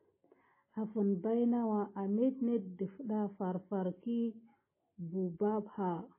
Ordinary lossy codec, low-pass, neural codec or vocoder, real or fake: MP3, 16 kbps; 3.6 kHz; none; real